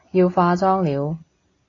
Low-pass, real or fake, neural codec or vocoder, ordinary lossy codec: 7.2 kHz; real; none; AAC, 32 kbps